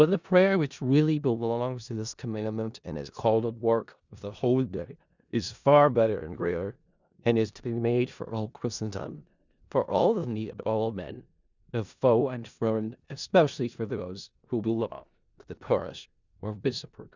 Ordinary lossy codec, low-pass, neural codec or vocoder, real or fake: Opus, 64 kbps; 7.2 kHz; codec, 16 kHz in and 24 kHz out, 0.4 kbps, LongCat-Audio-Codec, four codebook decoder; fake